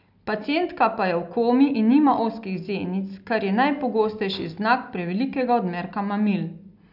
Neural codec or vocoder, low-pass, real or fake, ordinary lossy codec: none; 5.4 kHz; real; none